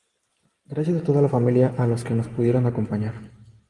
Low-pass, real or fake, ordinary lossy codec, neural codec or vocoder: 10.8 kHz; real; Opus, 24 kbps; none